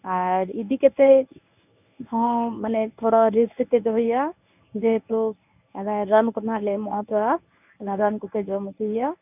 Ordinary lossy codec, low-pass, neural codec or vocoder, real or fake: none; 3.6 kHz; codec, 24 kHz, 0.9 kbps, WavTokenizer, medium speech release version 1; fake